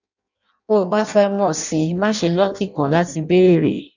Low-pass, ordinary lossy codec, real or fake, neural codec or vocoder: 7.2 kHz; none; fake; codec, 16 kHz in and 24 kHz out, 0.6 kbps, FireRedTTS-2 codec